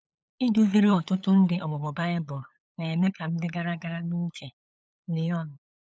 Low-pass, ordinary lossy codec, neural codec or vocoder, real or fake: none; none; codec, 16 kHz, 8 kbps, FunCodec, trained on LibriTTS, 25 frames a second; fake